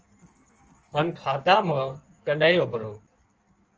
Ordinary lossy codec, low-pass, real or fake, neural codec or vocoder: Opus, 24 kbps; 7.2 kHz; fake; codec, 16 kHz in and 24 kHz out, 1.1 kbps, FireRedTTS-2 codec